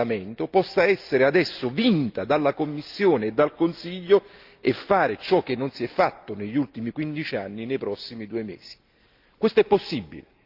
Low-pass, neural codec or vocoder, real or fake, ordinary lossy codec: 5.4 kHz; none; real; Opus, 24 kbps